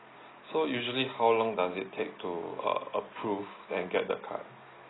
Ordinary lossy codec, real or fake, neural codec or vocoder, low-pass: AAC, 16 kbps; real; none; 7.2 kHz